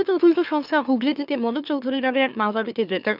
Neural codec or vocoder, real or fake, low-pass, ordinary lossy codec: autoencoder, 44.1 kHz, a latent of 192 numbers a frame, MeloTTS; fake; 5.4 kHz; none